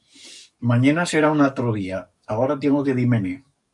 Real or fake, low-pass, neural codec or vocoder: fake; 10.8 kHz; codec, 44.1 kHz, 7.8 kbps, DAC